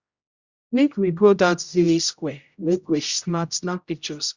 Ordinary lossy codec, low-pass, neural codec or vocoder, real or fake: Opus, 64 kbps; 7.2 kHz; codec, 16 kHz, 0.5 kbps, X-Codec, HuBERT features, trained on general audio; fake